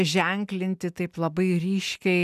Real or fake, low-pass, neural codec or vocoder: real; 14.4 kHz; none